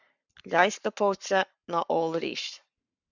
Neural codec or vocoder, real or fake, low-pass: codec, 44.1 kHz, 7.8 kbps, Pupu-Codec; fake; 7.2 kHz